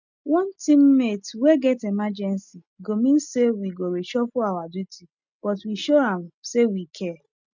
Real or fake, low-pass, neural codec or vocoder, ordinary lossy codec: real; 7.2 kHz; none; none